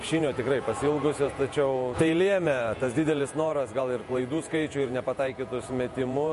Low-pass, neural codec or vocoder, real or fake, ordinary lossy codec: 14.4 kHz; vocoder, 48 kHz, 128 mel bands, Vocos; fake; MP3, 48 kbps